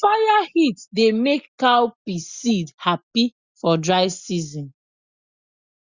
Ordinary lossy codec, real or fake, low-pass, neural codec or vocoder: Opus, 64 kbps; real; 7.2 kHz; none